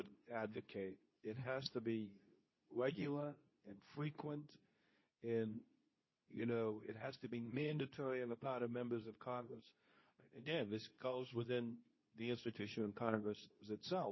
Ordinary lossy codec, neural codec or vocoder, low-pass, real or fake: MP3, 24 kbps; codec, 24 kHz, 0.9 kbps, WavTokenizer, medium speech release version 2; 7.2 kHz; fake